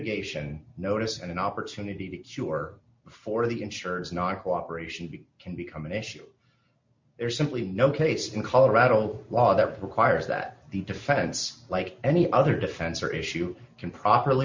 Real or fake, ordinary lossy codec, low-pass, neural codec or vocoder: real; MP3, 64 kbps; 7.2 kHz; none